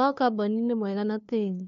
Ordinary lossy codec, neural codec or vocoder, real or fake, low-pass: MP3, 48 kbps; codec, 16 kHz, 2 kbps, FunCodec, trained on LibriTTS, 25 frames a second; fake; 7.2 kHz